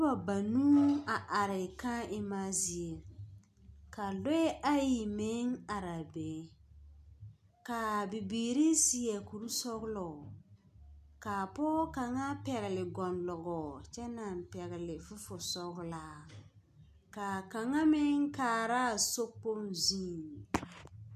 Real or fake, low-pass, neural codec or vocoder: real; 14.4 kHz; none